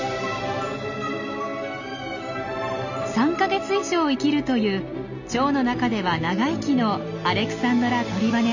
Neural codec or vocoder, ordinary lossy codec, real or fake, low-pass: none; none; real; 7.2 kHz